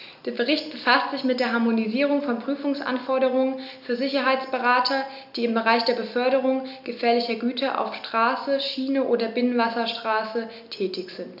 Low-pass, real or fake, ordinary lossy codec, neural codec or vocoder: 5.4 kHz; real; none; none